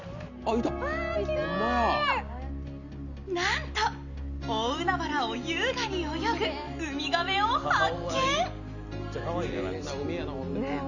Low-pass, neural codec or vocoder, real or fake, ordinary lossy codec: 7.2 kHz; none; real; none